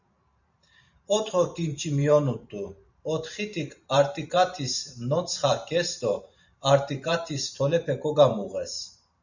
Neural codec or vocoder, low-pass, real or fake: vocoder, 44.1 kHz, 128 mel bands every 512 samples, BigVGAN v2; 7.2 kHz; fake